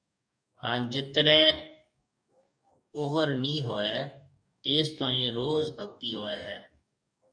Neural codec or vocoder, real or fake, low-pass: codec, 44.1 kHz, 2.6 kbps, DAC; fake; 9.9 kHz